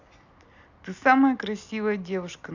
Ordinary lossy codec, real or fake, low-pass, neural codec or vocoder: none; real; 7.2 kHz; none